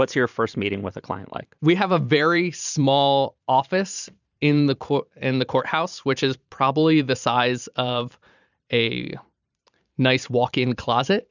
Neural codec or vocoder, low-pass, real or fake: vocoder, 44.1 kHz, 128 mel bands every 512 samples, BigVGAN v2; 7.2 kHz; fake